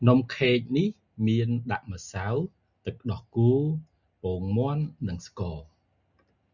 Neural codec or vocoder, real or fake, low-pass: none; real; 7.2 kHz